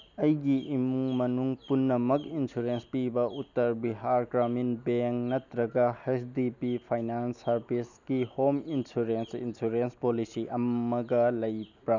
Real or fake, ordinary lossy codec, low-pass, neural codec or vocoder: real; none; 7.2 kHz; none